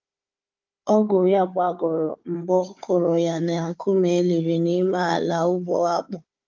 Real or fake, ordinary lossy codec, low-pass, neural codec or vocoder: fake; Opus, 24 kbps; 7.2 kHz; codec, 16 kHz, 4 kbps, FunCodec, trained on Chinese and English, 50 frames a second